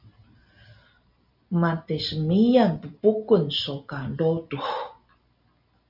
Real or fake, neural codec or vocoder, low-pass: real; none; 5.4 kHz